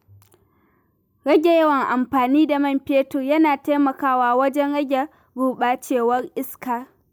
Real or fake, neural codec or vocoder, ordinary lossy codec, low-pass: real; none; none; none